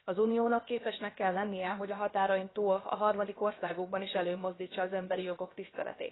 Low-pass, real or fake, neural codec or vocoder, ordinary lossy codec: 7.2 kHz; fake; codec, 16 kHz, about 1 kbps, DyCAST, with the encoder's durations; AAC, 16 kbps